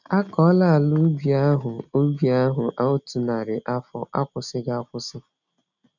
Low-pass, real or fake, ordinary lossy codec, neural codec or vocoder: 7.2 kHz; real; none; none